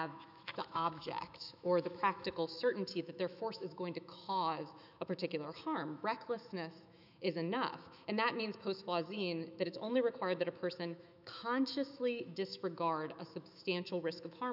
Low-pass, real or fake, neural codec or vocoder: 5.4 kHz; fake; autoencoder, 48 kHz, 128 numbers a frame, DAC-VAE, trained on Japanese speech